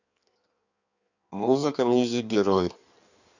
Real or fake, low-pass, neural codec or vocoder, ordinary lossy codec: fake; 7.2 kHz; codec, 16 kHz in and 24 kHz out, 1.1 kbps, FireRedTTS-2 codec; none